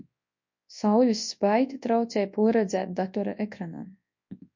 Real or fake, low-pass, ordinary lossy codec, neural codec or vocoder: fake; 7.2 kHz; MP3, 48 kbps; codec, 24 kHz, 0.9 kbps, WavTokenizer, large speech release